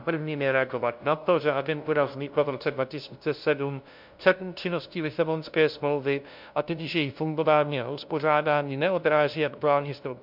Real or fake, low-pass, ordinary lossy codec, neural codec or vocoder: fake; 5.4 kHz; MP3, 48 kbps; codec, 16 kHz, 0.5 kbps, FunCodec, trained on LibriTTS, 25 frames a second